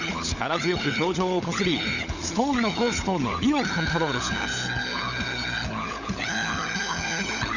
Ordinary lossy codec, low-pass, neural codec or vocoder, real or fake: none; 7.2 kHz; codec, 16 kHz, 16 kbps, FunCodec, trained on LibriTTS, 50 frames a second; fake